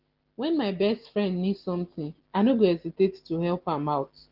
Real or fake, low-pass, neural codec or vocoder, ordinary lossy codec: real; 5.4 kHz; none; Opus, 16 kbps